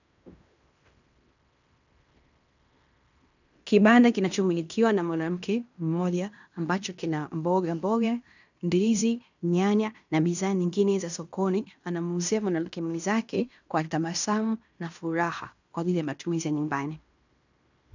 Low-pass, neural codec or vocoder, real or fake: 7.2 kHz; codec, 16 kHz in and 24 kHz out, 0.9 kbps, LongCat-Audio-Codec, fine tuned four codebook decoder; fake